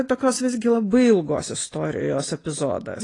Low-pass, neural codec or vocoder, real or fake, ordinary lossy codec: 10.8 kHz; none; real; AAC, 32 kbps